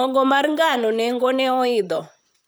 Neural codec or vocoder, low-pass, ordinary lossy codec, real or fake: vocoder, 44.1 kHz, 128 mel bands, Pupu-Vocoder; none; none; fake